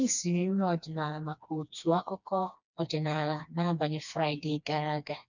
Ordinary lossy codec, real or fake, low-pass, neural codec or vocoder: none; fake; 7.2 kHz; codec, 16 kHz, 2 kbps, FreqCodec, smaller model